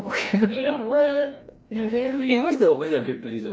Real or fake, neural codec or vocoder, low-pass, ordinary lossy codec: fake; codec, 16 kHz, 1 kbps, FreqCodec, larger model; none; none